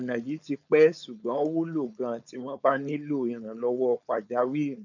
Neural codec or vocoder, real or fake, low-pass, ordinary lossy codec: codec, 16 kHz, 4.8 kbps, FACodec; fake; 7.2 kHz; none